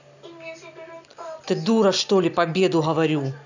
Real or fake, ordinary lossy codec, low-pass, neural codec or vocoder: real; none; 7.2 kHz; none